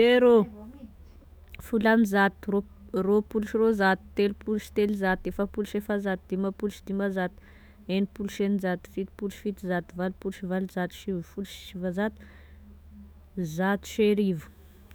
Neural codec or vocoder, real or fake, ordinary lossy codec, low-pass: autoencoder, 48 kHz, 128 numbers a frame, DAC-VAE, trained on Japanese speech; fake; none; none